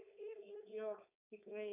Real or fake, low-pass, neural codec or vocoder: fake; 3.6 kHz; codec, 16 kHz, 4.8 kbps, FACodec